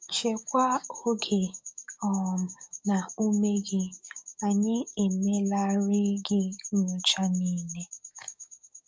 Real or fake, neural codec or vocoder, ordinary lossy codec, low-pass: fake; codec, 16 kHz, 6 kbps, DAC; none; none